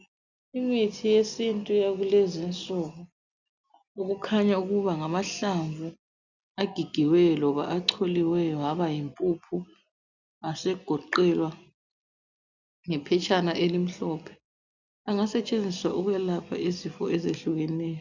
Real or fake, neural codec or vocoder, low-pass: real; none; 7.2 kHz